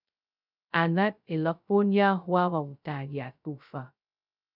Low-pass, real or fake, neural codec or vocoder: 5.4 kHz; fake; codec, 16 kHz, 0.2 kbps, FocalCodec